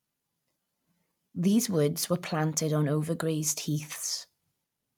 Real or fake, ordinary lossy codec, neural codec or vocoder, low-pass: real; none; none; 19.8 kHz